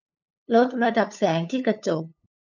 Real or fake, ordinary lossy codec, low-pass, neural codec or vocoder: fake; none; 7.2 kHz; codec, 16 kHz, 8 kbps, FunCodec, trained on LibriTTS, 25 frames a second